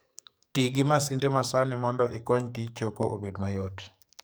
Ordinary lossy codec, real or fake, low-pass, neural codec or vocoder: none; fake; none; codec, 44.1 kHz, 2.6 kbps, SNAC